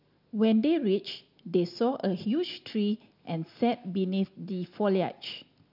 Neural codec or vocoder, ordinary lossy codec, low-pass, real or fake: none; none; 5.4 kHz; real